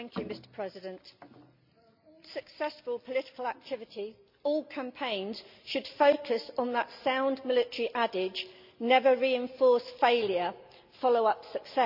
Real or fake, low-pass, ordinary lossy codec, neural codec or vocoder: real; 5.4 kHz; MP3, 48 kbps; none